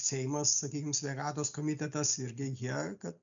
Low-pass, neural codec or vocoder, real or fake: 7.2 kHz; none; real